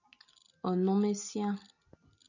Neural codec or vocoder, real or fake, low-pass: none; real; 7.2 kHz